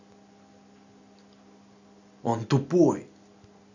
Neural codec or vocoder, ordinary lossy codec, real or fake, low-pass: none; none; real; 7.2 kHz